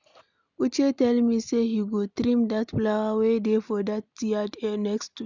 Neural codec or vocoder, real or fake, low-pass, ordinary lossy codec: none; real; 7.2 kHz; none